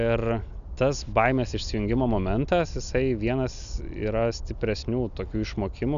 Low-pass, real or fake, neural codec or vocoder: 7.2 kHz; real; none